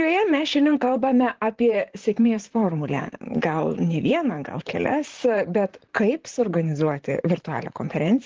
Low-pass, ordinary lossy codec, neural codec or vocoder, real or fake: 7.2 kHz; Opus, 16 kbps; vocoder, 44.1 kHz, 128 mel bands, Pupu-Vocoder; fake